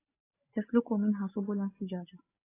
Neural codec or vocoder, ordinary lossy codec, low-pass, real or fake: none; AAC, 16 kbps; 3.6 kHz; real